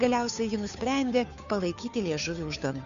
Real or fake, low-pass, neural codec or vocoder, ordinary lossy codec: fake; 7.2 kHz; codec, 16 kHz, 2 kbps, FunCodec, trained on Chinese and English, 25 frames a second; AAC, 96 kbps